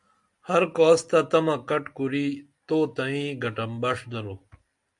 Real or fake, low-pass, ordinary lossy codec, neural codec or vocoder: real; 10.8 kHz; MP3, 64 kbps; none